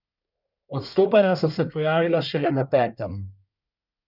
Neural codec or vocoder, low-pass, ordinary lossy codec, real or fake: codec, 24 kHz, 1 kbps, SNAC; 5.4 kHz; none; fake